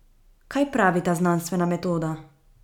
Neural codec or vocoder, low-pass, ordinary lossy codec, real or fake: none; 19.8 kHz; none; real